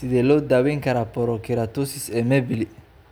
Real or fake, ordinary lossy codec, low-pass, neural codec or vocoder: real; none; none; none